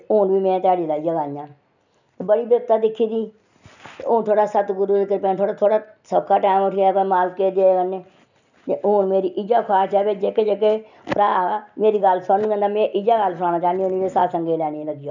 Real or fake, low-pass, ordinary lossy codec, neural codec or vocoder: real; 7.2 kHz; none; none